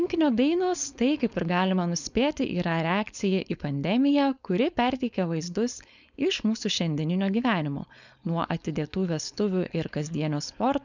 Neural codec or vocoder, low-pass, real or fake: codec, 16 kHz, 4.8 kbps, FACodec; 7.2 kHz; fake